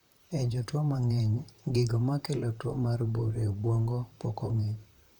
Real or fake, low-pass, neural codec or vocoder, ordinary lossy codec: fake; 19.8 kHz; vocoder, 44.1 kHz, 128 mel bands, Pupu-Vocoder; none